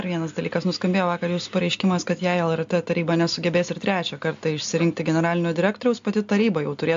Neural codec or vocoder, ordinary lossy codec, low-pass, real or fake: none; AAC, 64 kbps; 7.2 kHz; real